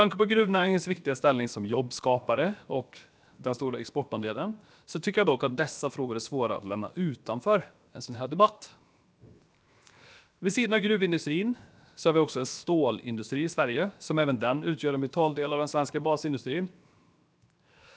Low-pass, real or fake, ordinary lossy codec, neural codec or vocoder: none; fake; none; codec, 16 kHz, 0.7 kbps, FocalCodec